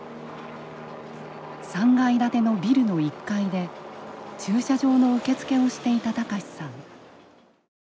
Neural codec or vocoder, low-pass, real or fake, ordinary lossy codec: none; none; real; none